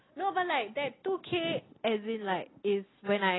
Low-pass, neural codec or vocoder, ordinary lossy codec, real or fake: 7.2 kHz; none; AAC, 16 kbps; real